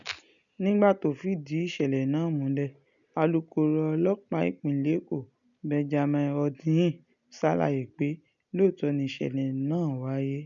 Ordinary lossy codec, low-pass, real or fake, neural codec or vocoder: none; 7.2 kHz; real; none